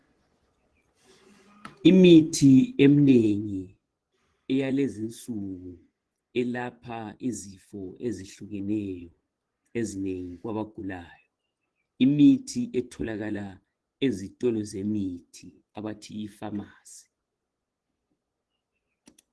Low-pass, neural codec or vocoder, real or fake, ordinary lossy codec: 10.8 kHz; vocoder, 24 kHz, 100 mel bands, Vocos; fake; Opus, 16 kbps